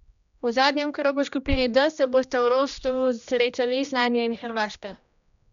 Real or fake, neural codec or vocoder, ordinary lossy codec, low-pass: fake; codec, 16 kHz, 1 kbps, X-Codec, HuBERT features, trained on general audio; MP3, 96 kbps; 7.2 kHz